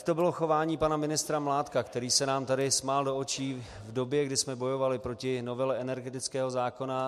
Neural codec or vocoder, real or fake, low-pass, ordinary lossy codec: none; real; 14.4 kHz; MP3, 64 kbps